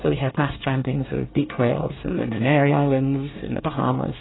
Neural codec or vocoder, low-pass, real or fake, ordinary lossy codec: codec, 24 kHz, 1 kbps, SNAC; 7.2 kHz; fake; AAC, 16 kbps